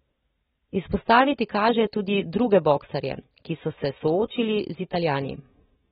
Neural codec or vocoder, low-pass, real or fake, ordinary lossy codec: none; 19.8 kHz; real; AAC, 16 kbps